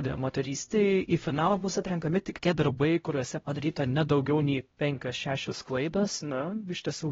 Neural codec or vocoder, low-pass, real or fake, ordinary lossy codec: codec, 16 kHz, 0.5 kbps, X-Codec, HuBERT features, trained on LibriSpeech; 7.2 kHz; fake; AAC, 24 kbps